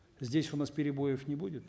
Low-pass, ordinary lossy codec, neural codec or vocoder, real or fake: none; none; none; real